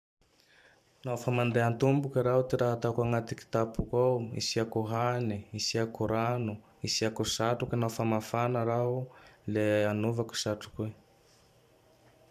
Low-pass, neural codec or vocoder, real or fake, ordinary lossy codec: 14.4 kHz; vocoder, 44.1 kHz, 128 mel bands every 512 samples, BigVGAN v2; fake; none